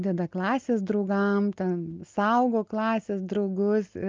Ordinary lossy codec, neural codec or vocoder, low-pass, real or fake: Opus, 24 kbps; none; 7.2 kHz; real